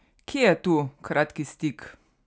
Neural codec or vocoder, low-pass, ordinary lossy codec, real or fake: none; none; none; real